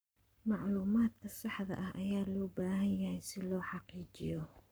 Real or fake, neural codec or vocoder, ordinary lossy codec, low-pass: fake; codec, 44.1 kHz, 7.8 kbps, Pupu-Codec; none; none